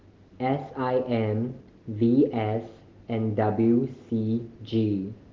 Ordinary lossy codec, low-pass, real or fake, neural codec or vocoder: Opus, 16 kbps; 7.2 kHz; real; none